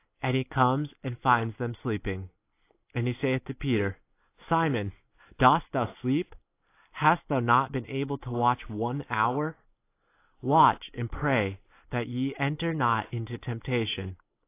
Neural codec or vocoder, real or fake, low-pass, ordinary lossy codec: none; real; 3.6 kHz; AAC, 24 kbps